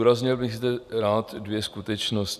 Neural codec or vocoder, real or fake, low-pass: none; real; 14.4 kHz